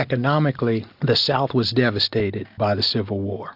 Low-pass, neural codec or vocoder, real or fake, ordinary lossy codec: 5.4 kHz; none; real; AAC, 48 kbps